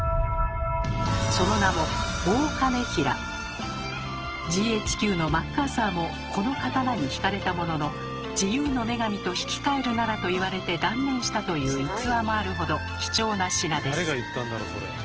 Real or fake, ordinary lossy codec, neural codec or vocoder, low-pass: real; Opus, 16 kbps; none; 7.2 kHz